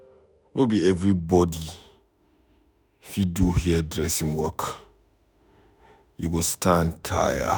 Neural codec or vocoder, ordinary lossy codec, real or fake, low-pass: autoencoder, 48 kHz, 32 numbers a frame, DAC-VAE, trained on Japanese speech; none; fake; none